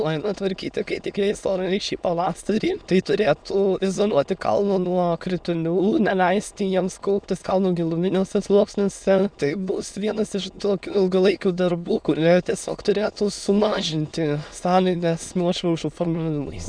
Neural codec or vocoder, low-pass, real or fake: autoencoder, 22.05 kHz, a latent of 192 numbers a frame, VITS, trained on many speakers; 9.9 kHz; fake